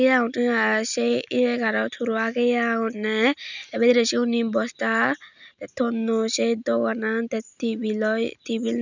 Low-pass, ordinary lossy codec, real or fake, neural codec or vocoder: 7.2 kHz; none; real; none